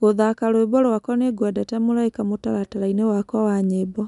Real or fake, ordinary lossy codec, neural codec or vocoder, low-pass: real; none; none; 10.8 kHz